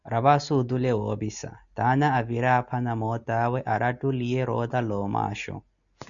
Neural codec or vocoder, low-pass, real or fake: none; 7.2 kHz; real